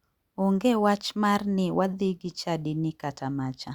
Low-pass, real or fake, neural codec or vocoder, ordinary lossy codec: 19.8 kHz; real; none; Opus, 64 kbps